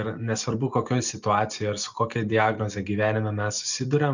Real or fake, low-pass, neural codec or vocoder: real; 7.2 kHz; none